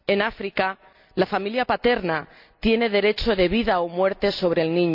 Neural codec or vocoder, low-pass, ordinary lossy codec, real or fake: none; 5.4 kHz; none; real